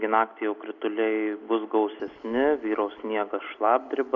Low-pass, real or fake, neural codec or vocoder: 7.2 kHz; real; none